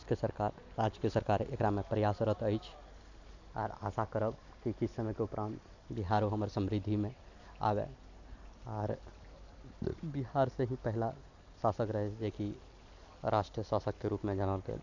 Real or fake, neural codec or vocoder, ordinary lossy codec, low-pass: real; none; none; 7.2 kHz